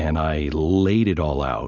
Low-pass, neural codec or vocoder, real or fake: 7.2 kHz; none; real